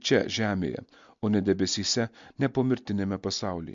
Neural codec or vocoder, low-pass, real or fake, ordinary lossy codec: none; 7.2 kHz; real; MP3, 64 kbps